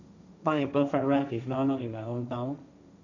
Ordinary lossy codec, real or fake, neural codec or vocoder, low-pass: none; fake; codec, 16 kHz, 1.1 kbps, Voila-Tokenizer; 7.2 kHz